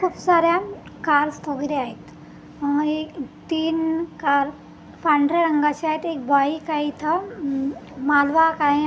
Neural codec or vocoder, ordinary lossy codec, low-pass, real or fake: none; none; none; real